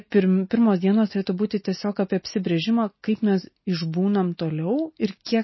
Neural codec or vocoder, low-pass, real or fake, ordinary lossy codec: none; 7.2 kHz; real; MP3, 24 kbps